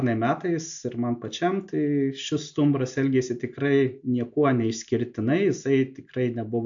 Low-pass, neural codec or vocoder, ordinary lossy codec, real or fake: 7.2 kHz; none; AAC, 64 kbps; real